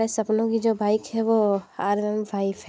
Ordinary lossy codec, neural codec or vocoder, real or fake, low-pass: none; none; real; none